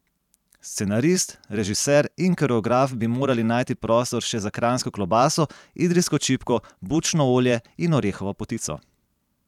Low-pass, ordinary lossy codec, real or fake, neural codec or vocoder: 19.8 kHz; none; fake; vocoder, 44.1 kHz, 128 mel bands every 512 samples, BigVGAN v2